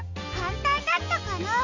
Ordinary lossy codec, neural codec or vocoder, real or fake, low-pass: none; none; real; 7.2 kHz